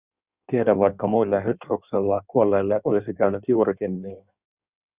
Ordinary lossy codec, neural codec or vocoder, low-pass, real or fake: Opus, 64 kbps; codec, 16 kHz in and 24 kHz out, 1.1 kbps, FireRedTTS-2 codec; 3.6 kHz; fake